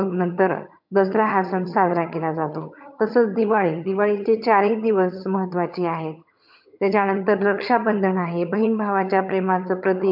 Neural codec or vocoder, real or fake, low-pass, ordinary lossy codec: vocoder, 22.05 kHz, 80 mel bands, HiFi-GAN; fake; 5.4 kHz; MP3, 48 kbps